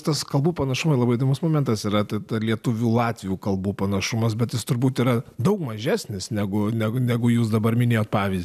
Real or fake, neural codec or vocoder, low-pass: real; none; 14.4 kHz